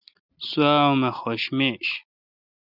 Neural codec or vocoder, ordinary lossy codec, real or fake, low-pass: none; Opus, 64 kbps; real; 5.4 kHz